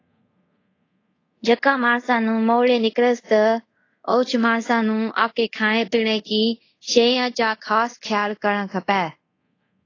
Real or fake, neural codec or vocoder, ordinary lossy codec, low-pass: fake; codec, 24 kHz, 0.5 kbps, DualCodec; AAC, 32 kbps; 7.2 kHz